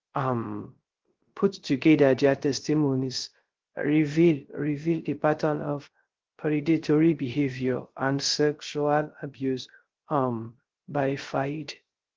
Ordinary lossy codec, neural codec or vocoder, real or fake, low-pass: Opus, 16 kbps; codec, 16 kHz, 0.3 kbps, FocalCodec; fake; 7.2 kHz